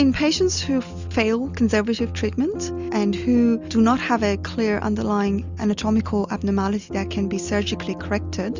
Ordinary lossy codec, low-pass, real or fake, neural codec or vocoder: Opus, 64 kbps; 7.2 kHz; real; none